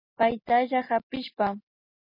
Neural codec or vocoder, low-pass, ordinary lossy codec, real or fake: none; 5.4 kHz; MP3, 24 kbps; real